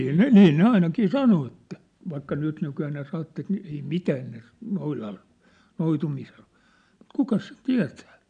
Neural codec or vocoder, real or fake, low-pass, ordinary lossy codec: none; real; 9.9 kHz; none